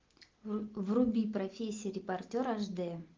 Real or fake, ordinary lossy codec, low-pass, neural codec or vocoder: real; Opus, 32 kbps; 7.2 kHz; none